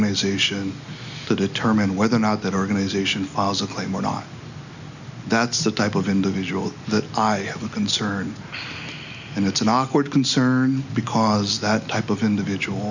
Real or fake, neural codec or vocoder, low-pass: real; none; 7.2 kHz